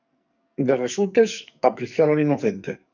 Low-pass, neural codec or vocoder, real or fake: 7.2 kHz; codec, 44.1 kHz, 2.6 kbps, SNAC; fake